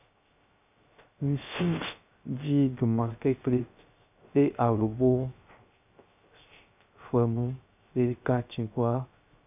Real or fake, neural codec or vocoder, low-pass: fake; codec, 16 kHz, 0.3 kbps, FocalCodec; 3.6 kHz